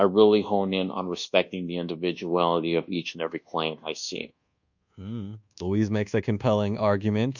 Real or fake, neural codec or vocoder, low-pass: fake; codec, 24 kHz, 1.2 kbps, DualCodec; 7.2 kHz